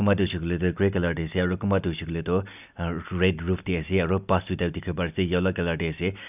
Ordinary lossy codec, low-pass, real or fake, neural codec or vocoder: none; 3.6 kHz; real; none